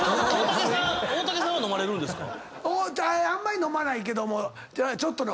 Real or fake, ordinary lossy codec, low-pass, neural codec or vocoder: real; none; none; none